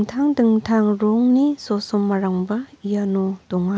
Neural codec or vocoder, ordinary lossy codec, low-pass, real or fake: none; none; none; real